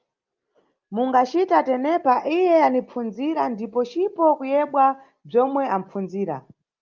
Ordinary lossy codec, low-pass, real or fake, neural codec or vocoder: Opus, 24 kbps; 7.2 kHz; real; none